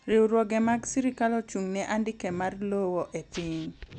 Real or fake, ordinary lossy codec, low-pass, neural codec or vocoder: real; none; 10.8 kHz; none